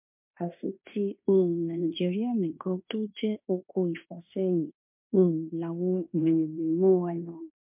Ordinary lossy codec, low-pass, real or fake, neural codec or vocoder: MP3, 32 kbps; 3.6 kHz; fake; codec, 16 kHz in and 24 kHz out, 0.9 kbps, LongCat-Audio-Codec, fine tuned four codebook decoder